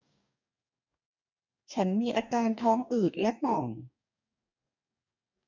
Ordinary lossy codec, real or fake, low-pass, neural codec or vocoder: none; fake; 7.2 kHz; codec, 44.1 kHz, 2.6 kbps, DAC